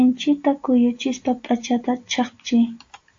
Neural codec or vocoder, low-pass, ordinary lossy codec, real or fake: none; 7.2 kHz; AAC, 48 kbps; real